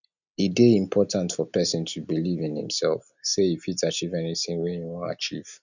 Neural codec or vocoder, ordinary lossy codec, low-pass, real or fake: none; none; 7.2 kHz; real